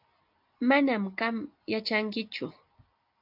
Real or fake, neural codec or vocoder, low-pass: real; none; 5.4 kHz